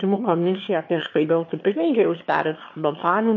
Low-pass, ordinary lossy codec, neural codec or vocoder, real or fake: 7.2 kHz; MP3, 32 kbps; autoencoder, 22.05 kHz, a latent of 192 numbers a frame, VITS, trained on one speaker; fake